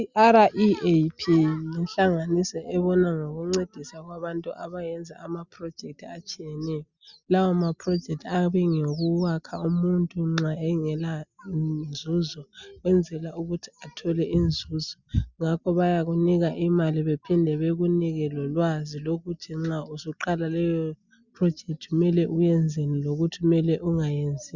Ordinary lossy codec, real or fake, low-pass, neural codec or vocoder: Opus, 64 kbps; real; 7.2 kHz; none